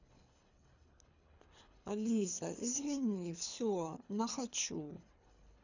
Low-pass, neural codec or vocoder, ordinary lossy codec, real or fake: 7.2 kHz; codec, 24 kHz, 3 kbps, HILCodec; none; fake